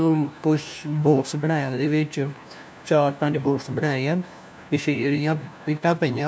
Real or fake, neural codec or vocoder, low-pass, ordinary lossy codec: fake; codec, 16 kHz, 1 kbps, FunCodec, trained on LibriTTS, 50 frames a second; none; none